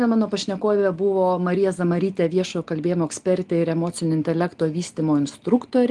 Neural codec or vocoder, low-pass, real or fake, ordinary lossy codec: none; 10.8 kHz; real; Opus, 24 kbps